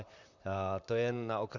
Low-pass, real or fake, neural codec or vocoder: 7.2 kHz; fake; vocoder, 44.1 kHz, 128 mel bands, Pupu-Vocoder